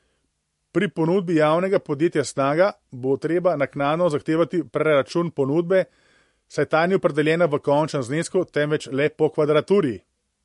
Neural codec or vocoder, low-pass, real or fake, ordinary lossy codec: none; 14.4 kHz; real; MP3, 48 kbps